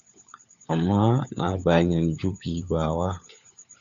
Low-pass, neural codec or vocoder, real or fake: 7.2 kHz; codec, 16 kHz, 4 kbps, FunCodec, trained on LibriTTS, 50 frames a second; fake